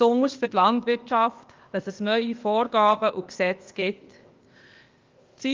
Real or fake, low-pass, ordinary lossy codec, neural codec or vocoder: fake; 7.2 kHz; Opus, 32 kbps; codec, 16 kHz, 0.8 kbps, ZipCodec